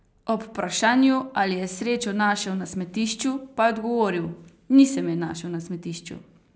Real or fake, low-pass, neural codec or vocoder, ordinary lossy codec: real; none; none; none